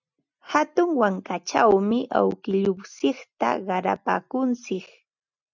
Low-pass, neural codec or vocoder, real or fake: 7.2 kHz; none; real